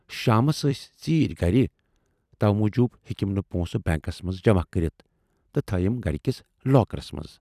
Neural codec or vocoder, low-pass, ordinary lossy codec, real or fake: vocoder, 44.1 kHz, 128 mel bands every 256 samples, BigVGAN v2; 14.4 kHz; none; fake